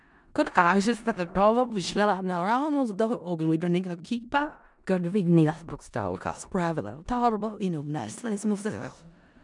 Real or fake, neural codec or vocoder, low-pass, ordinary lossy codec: fake; codec, 16 kHz in and 24 kHz out, 0.4 kbps, LongCat-Audio-Codec, four codebook decoder; 10.8 kHz; MP3, 96 kbps